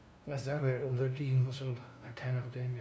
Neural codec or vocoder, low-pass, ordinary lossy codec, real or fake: codec, 16 kHz, 0.5 kbps, FunCodec, trained on LibriTTS, 25 frames a second; none; none; fake